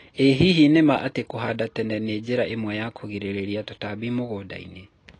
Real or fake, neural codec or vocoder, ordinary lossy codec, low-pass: real; none; AAC, 32 kbps; 9.9 kHz